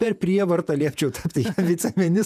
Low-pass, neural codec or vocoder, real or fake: 14.4 kHz; vocoder, 48 kHz, 128 mel bands, Vocos; fake